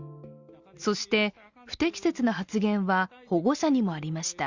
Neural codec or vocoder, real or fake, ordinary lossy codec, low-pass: none; real; Opus, 64 kbps; 7.2 kHz